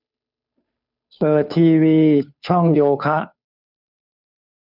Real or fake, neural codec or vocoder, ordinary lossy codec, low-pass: fake; codec, 16 kHz, 2 kbps, FunCodec, trained on Chinese and English, 25 frames a second; none; 5.4 kHz